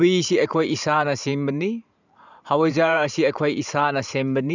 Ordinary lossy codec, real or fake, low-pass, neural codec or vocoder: none; fake; 7.2 kHz; vocoder, 44.1 kHz, 128 mel bands every 512 samples, BigVGAN v2